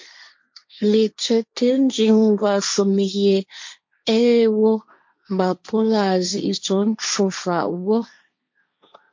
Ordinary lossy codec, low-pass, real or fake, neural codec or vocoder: MP3, 48 kbps; 7.2 kHz; fake; codec, 16 kHz, 1.1 kbps, Voila-Tokenizer